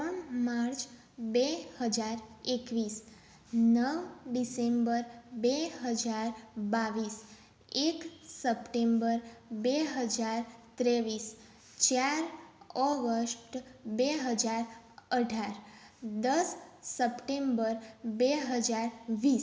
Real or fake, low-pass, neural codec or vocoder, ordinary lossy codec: real; none; none; none